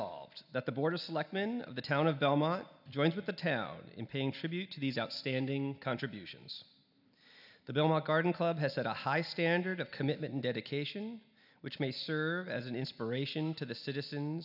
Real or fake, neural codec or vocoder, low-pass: real; none; 5.4 kHz